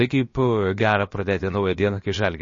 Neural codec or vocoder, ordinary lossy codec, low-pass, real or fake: codec, 16 kHz, about 1 kbps, DyCAST, with the encoder's durations; MP3, 32 kbps; 7.2 kHz; fake